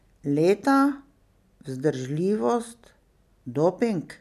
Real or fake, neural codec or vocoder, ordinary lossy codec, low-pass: real; none; none; 14.4 kHz